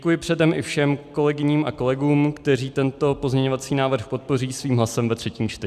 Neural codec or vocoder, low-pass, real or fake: none; 14.4 kHz; real